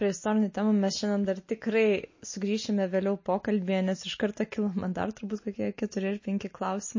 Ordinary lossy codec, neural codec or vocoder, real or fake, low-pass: MP3, 32 kbps; none; real; 7.2 kHz